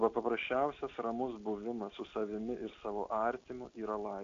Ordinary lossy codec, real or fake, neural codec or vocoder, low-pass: AAC, 64 kbps; real; none; 7.2 kHz